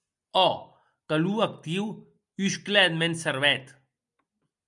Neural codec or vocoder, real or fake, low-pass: none; real; 10.8 kHz